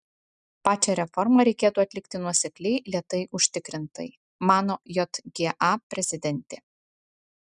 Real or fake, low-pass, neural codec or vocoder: real; 10.8 kHz; none